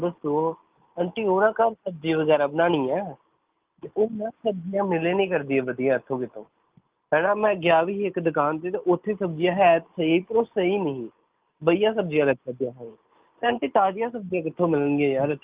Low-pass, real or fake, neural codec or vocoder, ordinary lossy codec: 3.6 kHz; real; none; Opus, 32 kbps